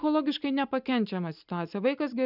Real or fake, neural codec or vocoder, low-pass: real; none; 5.4 kHz